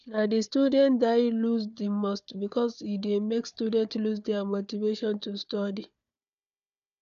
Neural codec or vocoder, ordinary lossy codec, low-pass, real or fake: codec, 16 kHz, 4 kbps, FunCodec, trained on Chinese and English, 50 frames a second; none; 7.2 kHz; fake